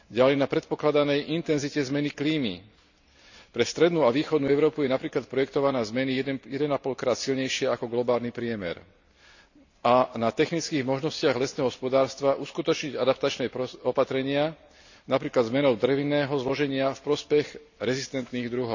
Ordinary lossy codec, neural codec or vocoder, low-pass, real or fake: none; none; 7.2 kHz; real